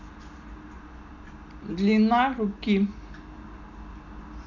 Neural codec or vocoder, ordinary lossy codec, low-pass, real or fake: none; none; 7.2 kHz; real